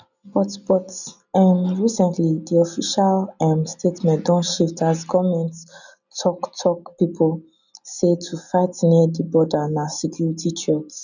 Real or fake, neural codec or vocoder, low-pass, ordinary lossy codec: real; none; 7.2 kHz; none